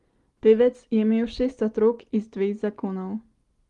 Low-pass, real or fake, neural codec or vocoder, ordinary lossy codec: 10.8 kHz; real; none; Opus, 24 kbps